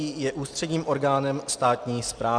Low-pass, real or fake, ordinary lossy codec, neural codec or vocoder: 9.9 kHz; real; Opus, 64 kbps; none